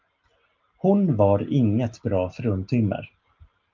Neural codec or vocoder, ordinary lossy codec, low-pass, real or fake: none; Opus, 24 kbps; 7.2 kHz; real